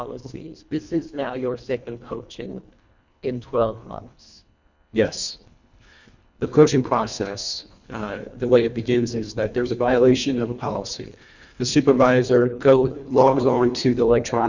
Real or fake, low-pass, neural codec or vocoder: fake; 7.2 kHz; codec, 24 kHz, 1.5 kbps, HILCodec